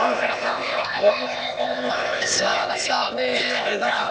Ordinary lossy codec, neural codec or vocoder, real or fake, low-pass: none; codec, 16 kHz, 0.8 kbps, ZipCodec; fake; none